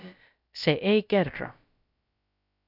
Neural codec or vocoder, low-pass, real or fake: codec, 16 kHz, about 1 kbps, DyCAST, with the encoder's durations; 5.4 kHz; fake